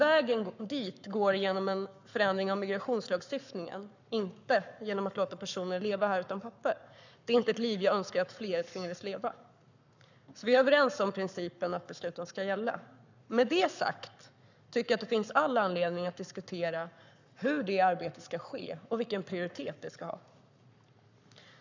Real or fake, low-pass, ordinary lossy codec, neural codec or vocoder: fake; 7.2 kHz; none; codec, 44.1 kHz, 7.8 kbps, Pupu-Codec